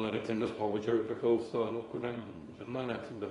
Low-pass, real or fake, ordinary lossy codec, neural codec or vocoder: 10.8 kHz; fake; AAC, 32 kbps; codec, 24 kHz, 0.9 kbps, WavTokenizer, small release